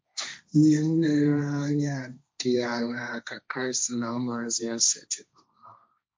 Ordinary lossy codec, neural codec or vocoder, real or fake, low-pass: none; codec, 16 kHz, 1.1 kbps, Voila-Tokenizer; fake; none